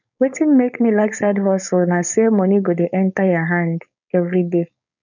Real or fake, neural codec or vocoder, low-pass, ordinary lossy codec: fake; codec, 16 kHz, 4.8 kbps, FACodec; 7.2 kHz; none